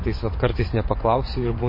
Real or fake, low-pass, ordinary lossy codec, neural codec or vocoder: fake; 5.4 kHz; MP3, 24 kbps; vocoder, 24 kHz, 100 mel bands, Vocos